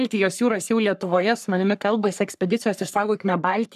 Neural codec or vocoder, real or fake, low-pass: codec, 44.1 kHz, 3.4 kbps, Pupu-Codec; fake; 14.4 kHz